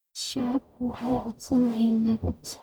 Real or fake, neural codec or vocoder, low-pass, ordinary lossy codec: fake; codec, 44.1 kHz, 0.9 kbps, DAC; none; none